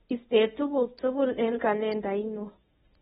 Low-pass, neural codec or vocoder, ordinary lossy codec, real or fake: 10.8 kHz; codec, 24 kHz, 0.9 kbps, WavTokenizer, medium speech release version 1; AAC, 16 kbps; fake